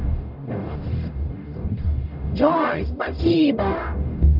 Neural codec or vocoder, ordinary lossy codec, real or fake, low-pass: codec, 44.1 kHz, 0.9 kbps, DAC; none; fake; 5.4 kHz